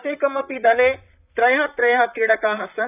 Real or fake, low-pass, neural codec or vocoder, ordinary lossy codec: fake; 3.6 kHz; vocoder, 44.1 kHz, 128 mel bands, Pupu-Vocoder; none